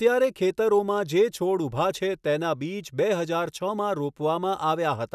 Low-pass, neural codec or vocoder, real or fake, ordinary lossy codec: 14.4 kHz; none; real; none